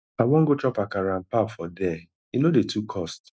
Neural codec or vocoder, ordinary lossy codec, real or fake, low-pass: none; none; real; none